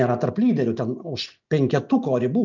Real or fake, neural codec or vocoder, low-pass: real; none; 7.2 kHz